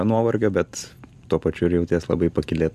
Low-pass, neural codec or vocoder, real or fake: 14.4 kHz; none; real